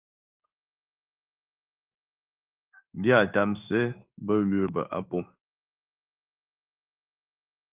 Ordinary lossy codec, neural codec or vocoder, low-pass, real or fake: Opus, 24 kbps; codec, 16 kHz in and 24 kHz out, 1 kbps, XY-Tokenizer; 3.6 kHz; fake